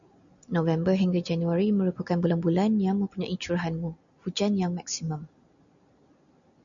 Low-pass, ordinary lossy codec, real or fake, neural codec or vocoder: 7.2 kHz; MP3, 96 kbps; real; none